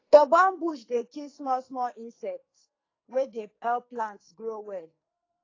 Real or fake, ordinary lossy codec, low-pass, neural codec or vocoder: fake; AAC, 32 kbps; 7.2 kHz; codec, 44.1 kHz, 2.6 kbps, SNAC